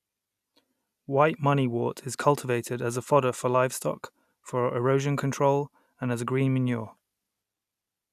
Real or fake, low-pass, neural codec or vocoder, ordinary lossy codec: real; 14.4 kHz; none; none